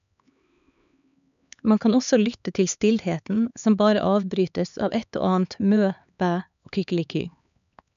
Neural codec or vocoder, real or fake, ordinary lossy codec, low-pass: codec, 16 kHz, 4 kbps, X-Codec, HuBERT features, trained on LibriSpeech; fake; MP3, 96 kbps; 7.2 kHz